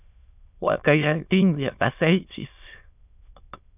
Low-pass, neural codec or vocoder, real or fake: 3.6 kHz; autoencoder, 22.05 kHz, a latent of 192 numbers a frame, VITS, trained on many speakers; fake